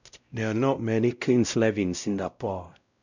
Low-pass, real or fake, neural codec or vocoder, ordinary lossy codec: 7.2 kHz; fake; codec, 16 kHz, 0.5 kbps, X-Codec, WavLM features, trained on Multilingual LibriSpeech; none